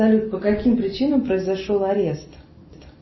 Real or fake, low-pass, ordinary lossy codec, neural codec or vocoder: real; 7.2 kHz; MP3, 24 kbps; none